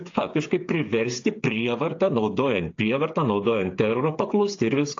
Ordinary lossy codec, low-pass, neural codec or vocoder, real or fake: AAC, 48 kbps; 7.2 kHz; codec, 16 kHz, 8 kbps, FreqCodec, smaller model; fake